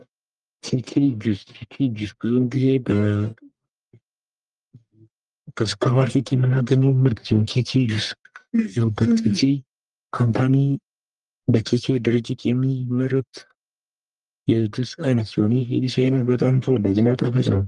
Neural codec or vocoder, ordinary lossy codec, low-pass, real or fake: codec, 44.1 kHz, 1.7 kbps, Pupu-Codec; Opus, 32 kbps; 10.8 kHz; fake